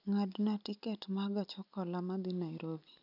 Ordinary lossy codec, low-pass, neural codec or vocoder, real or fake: none; 5.4 kHz; none; real